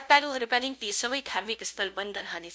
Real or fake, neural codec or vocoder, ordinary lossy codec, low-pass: fake; codec, 16 kHz, 0.5 kbps, FunCodec, trained on LibriTTS, 25 frames a second; none; none